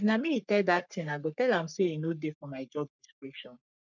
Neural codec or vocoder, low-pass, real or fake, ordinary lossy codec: codec, 44.1 kHz, 3.4 kbps, Pupu-Codec; 7.2 kHz; fake; none